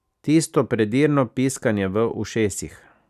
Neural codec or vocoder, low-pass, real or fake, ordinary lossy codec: none; 14.4 kHz; real; none